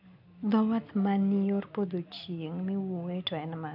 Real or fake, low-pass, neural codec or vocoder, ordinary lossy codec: real; 5.4 kHz; none; none